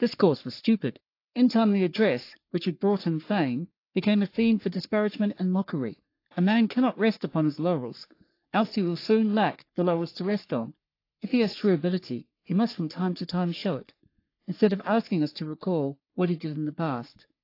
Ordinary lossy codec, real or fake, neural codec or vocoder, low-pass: AAC, 32 kbps; fake; codec, 44.1 kHz, 3.4 kbps, Pupu-Codec; 5.4 kHz